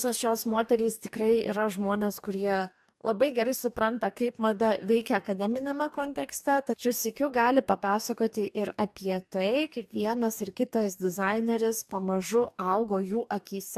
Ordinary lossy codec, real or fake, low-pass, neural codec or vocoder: AAC, 96 kbps; fake; 14.4 kHz; codec, 44.1 kHz, 2.6 kbps, DAC